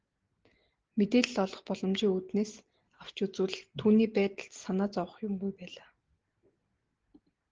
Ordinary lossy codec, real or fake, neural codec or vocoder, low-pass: Opus, 16 kbps; real; none; 7.2 kHz